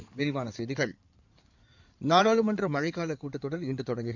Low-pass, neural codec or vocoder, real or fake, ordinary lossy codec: 7.2 kHz; codec, 16 kHz in and 24 kHz out, 2.2 kbps, FireRedTTS-2 codec; fake; none